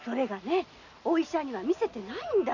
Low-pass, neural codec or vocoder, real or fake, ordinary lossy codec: 7.2 kHz; none; real; none